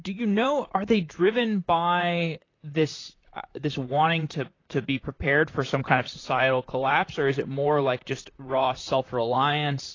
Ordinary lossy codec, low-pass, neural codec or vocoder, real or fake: AAC, 32 kbps; 7.2 kHz; vocoder, 44.1 kHz, 128 mel bands, Pupu-Vocoder; fake